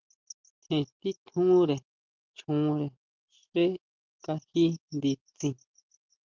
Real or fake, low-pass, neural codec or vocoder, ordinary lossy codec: real; 7.2 kHz; none; Opus, 32 kbps